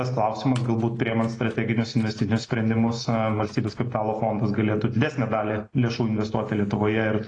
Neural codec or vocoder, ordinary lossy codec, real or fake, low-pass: none; AAC, 32 kbps; real; 9.9 kHz